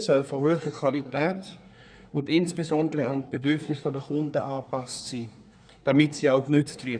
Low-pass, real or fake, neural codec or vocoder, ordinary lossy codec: 9.9 kHz; fake; codec, 24 kHz, 1 kbps, SNAC; none